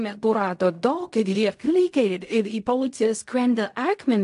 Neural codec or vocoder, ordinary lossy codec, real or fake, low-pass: codec, 16 kHz in and 24 kHz out, 0.4 kbps, LongCat-Audio-Codec, fine tuned four codebook decoder; AAC, 64 kbps; fake; 10.8 kHz